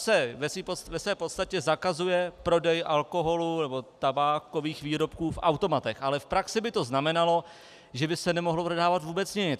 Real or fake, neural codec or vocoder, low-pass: real; none; 14.4 kHz